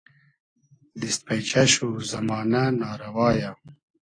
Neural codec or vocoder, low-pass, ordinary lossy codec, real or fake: none; 9.9 kHz; AAC, 32 kbps; real